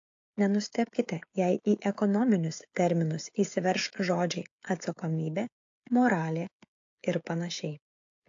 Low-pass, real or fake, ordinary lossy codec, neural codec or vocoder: 7.2 kHz; fake; AAC, 48 kbps; codec, 16 kHz, 16 kbps, FreqCodec, smaller model